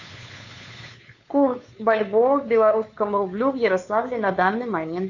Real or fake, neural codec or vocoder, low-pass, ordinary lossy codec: fake; codec, 16 kHz, 2 kbps, FunCodec, trained on Chinese and English, 25 frames a second; 7.2 kHz; AAC, 48 kbps